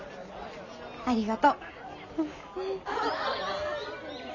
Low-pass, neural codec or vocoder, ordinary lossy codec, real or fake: 7.2 kHz; none; none; real